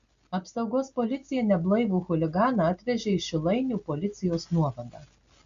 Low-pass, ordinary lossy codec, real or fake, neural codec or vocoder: 7.2 kHz; Opus, 64 kbps; real; none